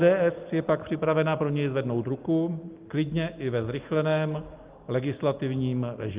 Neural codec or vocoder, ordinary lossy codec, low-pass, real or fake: none; Opus, 32 kbps; 3.6 kHz; real